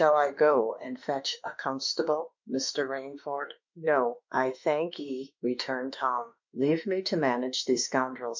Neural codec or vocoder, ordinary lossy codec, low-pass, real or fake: autoencoder, 48 kHz, 32 numbers a frame, DAC-VAE, trained on Japanese speech; MP3, 64 kbps; 7.2 kHz; fake